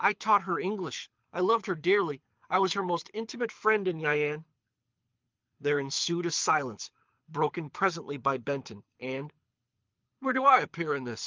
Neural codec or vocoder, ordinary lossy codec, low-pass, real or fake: codec, 24 kHz, 6 kbps, HILCodec; Opus, 24 kbps; 7.2 kHz; fake